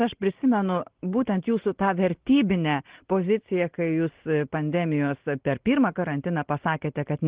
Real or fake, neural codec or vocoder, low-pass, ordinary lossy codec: real; none; 3.6 kHz; Opus, 16 kbps